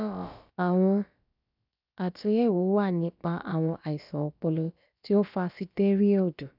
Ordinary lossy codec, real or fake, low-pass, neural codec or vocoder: none; fake; 5.4 kHz; codec, 16 kHz, about 1 kbps, DyCAST, with the encoder's durations